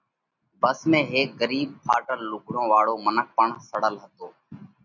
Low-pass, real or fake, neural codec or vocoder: 7.2 kHz; real; none